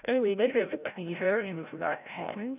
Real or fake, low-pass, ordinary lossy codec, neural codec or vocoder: fake; 3.6 kHz; none; codec, 16 kHz, 0.5 kbps, FreqCodec, larger model